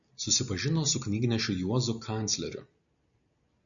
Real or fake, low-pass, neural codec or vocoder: real; 7.2 kHz; none